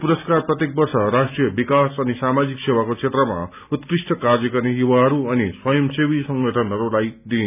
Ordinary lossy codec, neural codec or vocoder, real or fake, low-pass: none; none; real; 3.6 kHz